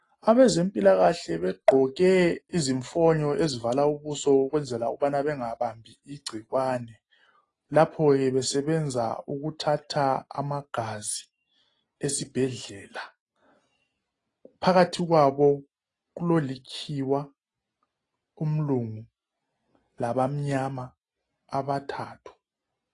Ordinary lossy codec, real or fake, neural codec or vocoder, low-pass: AAC, 32 kbps; real; none; 10.8 kHz